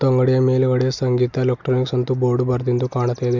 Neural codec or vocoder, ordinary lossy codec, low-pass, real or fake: none; MP3, 64 kbps; 7.2 kHz; real